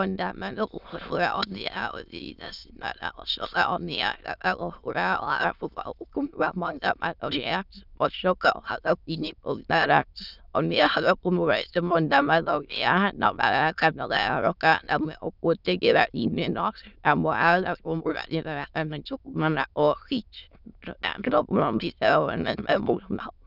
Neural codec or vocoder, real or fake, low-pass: autoencoder, 22.05 kHz, a latent of 192 numbers a frame, VITS, trained on many speakers; fake; 5.4 kHz